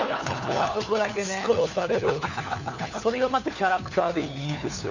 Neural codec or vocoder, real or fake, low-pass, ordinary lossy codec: codec, 16 kHz, 4 kbps, FunCodec, trained on LibriTTS, 50 frames a second; fake; 7.2 kHz; none